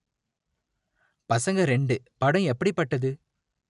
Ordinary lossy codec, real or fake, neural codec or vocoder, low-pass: none; real; none; 10.8 kHz